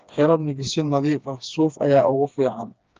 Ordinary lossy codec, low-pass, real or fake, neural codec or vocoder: Opus, 24 kbps; 7.2 kHz; fake; codec, 16 kHz, 2 kbps, FreqCodec, smaller model